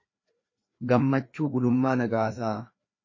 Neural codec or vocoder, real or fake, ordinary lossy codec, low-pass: codec, 16 kHz, 2 kbps, FreqCodec, larger model; fake; MP3, 32 kbps; 7.2 kHz